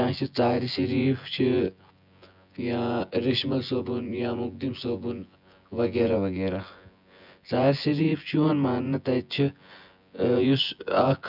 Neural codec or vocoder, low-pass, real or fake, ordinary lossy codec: vocoder, 24 kHz, 100 mel bands, Vocos; 5.4 kHz; fake; none